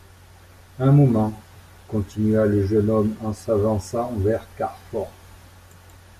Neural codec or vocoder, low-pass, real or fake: none; 14.4 kHz; real